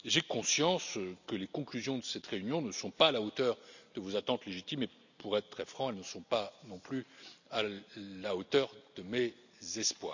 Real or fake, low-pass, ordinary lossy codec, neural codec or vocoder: real; 7.2 kHz; none; none